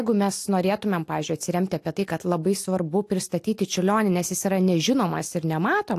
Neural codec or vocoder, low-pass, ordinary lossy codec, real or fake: none; 14.4 kHz; AAC, 64 kbps; real